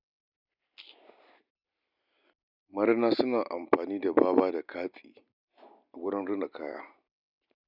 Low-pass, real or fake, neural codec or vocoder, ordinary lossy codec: 5.4 kHz; real; none; none